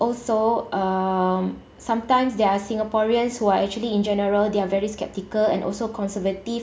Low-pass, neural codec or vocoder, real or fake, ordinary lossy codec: none; none; real; none